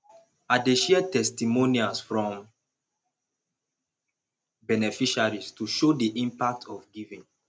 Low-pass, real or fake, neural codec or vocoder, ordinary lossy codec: none; real; none; none